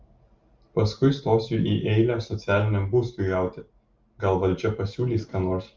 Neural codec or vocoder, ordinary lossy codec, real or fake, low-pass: none; Opus, 32 kbps; real; 7.2 kHz